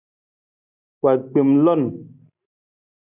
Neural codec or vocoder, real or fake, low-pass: none; real; 3.6 kHz